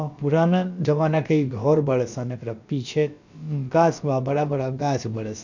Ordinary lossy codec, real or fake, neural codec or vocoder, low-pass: none; fake; codec, 16 kHz, about 1 kbps, DyCAST, with the encoder's durations; 7.2 kHz